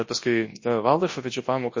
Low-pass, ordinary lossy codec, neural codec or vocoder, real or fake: 7.2 kHz; MP3, 32 kbps; codec, 24 kHz, 0.9 kbps, WavTokenizer, large speech release; fake